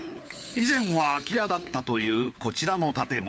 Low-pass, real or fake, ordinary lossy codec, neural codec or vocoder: none; fake; none; codec, 16 kHz, 4 kbps, FunCodec, trained on LibriTTS, 50 frames a second